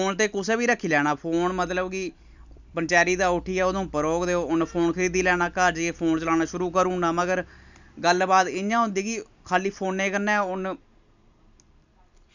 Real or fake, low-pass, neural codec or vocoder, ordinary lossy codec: fake; 7.2 kHz; vocoder, 44.1 kHz, 128 mel bands every 256 samples, BigVGAN v2; none